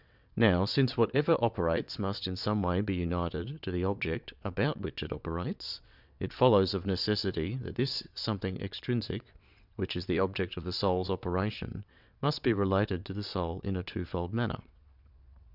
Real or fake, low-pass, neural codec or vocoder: fake; 5.4 kHz; vocoder, 22.05 kHz, 80 mel bands, WaveNeXt